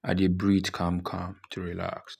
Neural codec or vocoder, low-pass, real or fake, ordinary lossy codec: none; 14.4 kHz; real; none